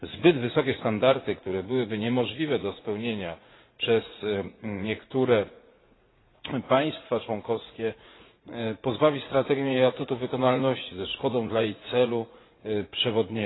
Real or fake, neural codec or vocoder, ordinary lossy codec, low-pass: fake; vocoder, 44.1 kHz, 80 mel bands, Vocos; AAC, 16 kbps; 7.2 kHz